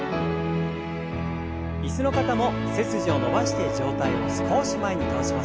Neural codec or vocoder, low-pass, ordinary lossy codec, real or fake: none; none; none; real